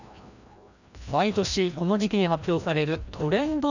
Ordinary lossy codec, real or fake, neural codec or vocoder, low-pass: none; fake; codec, 16 kHz, 1 kbps, FreqCodec, larger model; 7.2 kHz